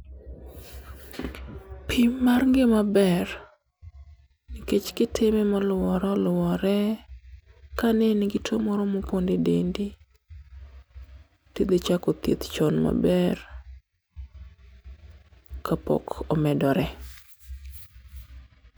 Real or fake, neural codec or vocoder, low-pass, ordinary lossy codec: real; none; none; none